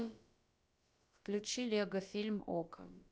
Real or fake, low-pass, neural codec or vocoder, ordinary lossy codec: fake; none; codec, 16 kHz, about 1 kbps, DyCAST, with the encoder's durations; none